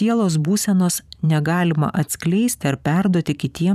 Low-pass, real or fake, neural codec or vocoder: 14.4 kHz; fake; vocoder, 44.1 kHz, 128 mel bands every 512 samples, BigVGAN v2